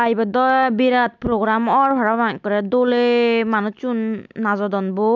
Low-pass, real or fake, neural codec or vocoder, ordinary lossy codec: 7.2 kHz; real; none; none